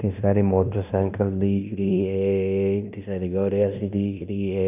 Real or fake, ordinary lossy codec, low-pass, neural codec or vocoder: fake; none; 3.6 kHz; codec, 16 kHz in and 24 kHz out, 0.9 kbps, LongCat-Audio-Codec, fine tuned four codebook decoder